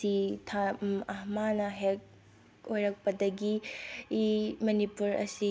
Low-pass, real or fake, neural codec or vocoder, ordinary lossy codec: none; real; none; none